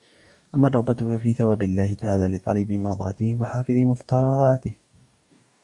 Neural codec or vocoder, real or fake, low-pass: codec, 44.1 kHz, 2.6 kbps, DAC; fake; 10.8 kHz